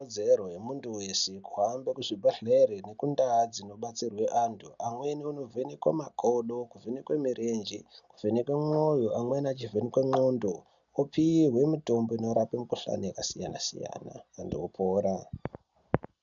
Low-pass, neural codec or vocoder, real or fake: 7.2 kHz; none; real